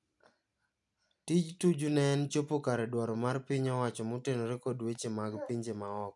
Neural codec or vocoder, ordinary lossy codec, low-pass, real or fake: none; none; 10.8 kHz; real